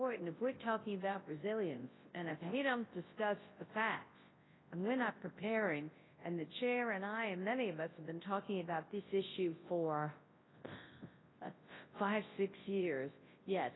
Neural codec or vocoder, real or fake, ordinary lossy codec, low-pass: codec, 24 kHz, 0.9 kbps, WavTokenizer, large speech release; fake; AAC, 16 kbps; 7.2 kHz